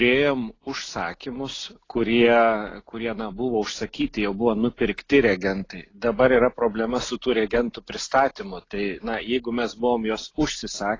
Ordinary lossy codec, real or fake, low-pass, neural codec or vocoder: AAC, 32 kbps; real; 7.2 kHz; none